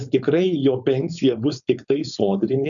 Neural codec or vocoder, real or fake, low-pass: codec, 16 kHz, 4.8 kbps, FACodec; fake; 7.2 kHz